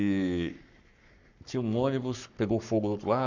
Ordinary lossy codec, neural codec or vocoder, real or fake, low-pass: none; codec, 44.1 kHz, 7.8 kbps, Pupu-Codec; fake; 7.2 kHz